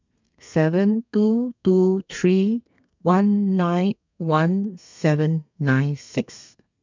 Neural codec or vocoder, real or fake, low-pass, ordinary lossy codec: codec, 32 kHz, 1.9 kbps, SNAC; fake; 7.2 kHz; MP3, 64 kbps